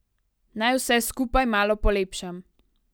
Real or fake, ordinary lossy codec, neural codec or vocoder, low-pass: real; none; none; none